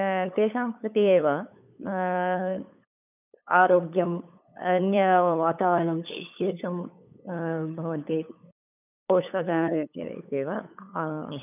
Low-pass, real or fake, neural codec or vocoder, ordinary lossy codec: 3.6 kHz; fake; codec, 16 kHz, 8 kbps, FunCodec, trained on LibriTTS, 25 frames a second; none